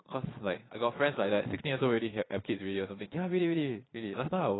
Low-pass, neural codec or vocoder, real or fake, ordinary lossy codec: 7.2 kHz; vocoder, 22.05 kHz, 80 mel bands, Vocos; fake; AAC, 16 kbps